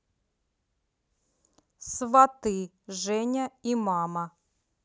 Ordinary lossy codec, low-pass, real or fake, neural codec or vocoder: none; none; real; none